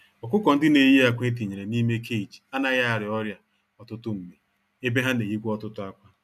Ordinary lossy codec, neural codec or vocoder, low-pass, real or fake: none; none; 14.4 kHz; real